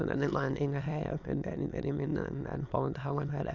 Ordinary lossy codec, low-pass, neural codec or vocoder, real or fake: none; 7.2 kHz; autoencoder, 22.05 kHz, a latent of 192 numbers a frame, VITS, trained on many speakers; fake